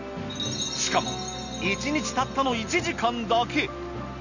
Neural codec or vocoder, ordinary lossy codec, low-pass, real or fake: none; none; 7.2 kHz; real